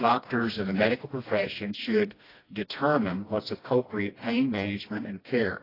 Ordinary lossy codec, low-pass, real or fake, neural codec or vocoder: AAC, 24 kbps; 5.4 kHz; fake; codec, 16 kHz, 1 kbps, FreqCodec, smaller model